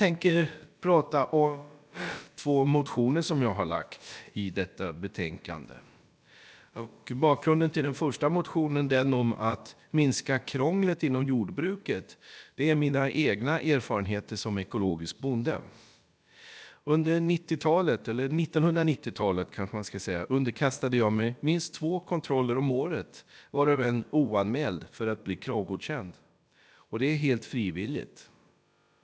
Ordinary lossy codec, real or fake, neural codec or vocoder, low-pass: none; fake; codec, 16 kHz, about 1 kbps, DyCAST, with the encoder's durations; none